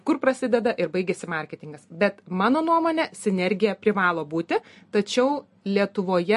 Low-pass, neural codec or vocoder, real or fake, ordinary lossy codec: 14.4 kHz; none; real; MP3, 48 kbps